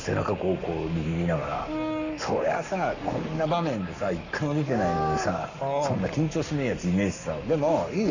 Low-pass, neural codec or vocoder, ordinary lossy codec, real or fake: 7.2 kHz; codec, 44.1 kHz, 7.8 kbps, Pupu-Codec; none; fake